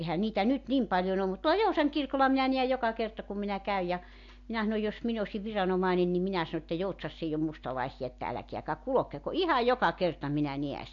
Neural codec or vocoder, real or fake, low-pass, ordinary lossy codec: none; real; 7.2 kHz; AAC, 48 kbps